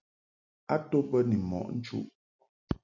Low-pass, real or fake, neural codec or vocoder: 7.2 kHz; real; none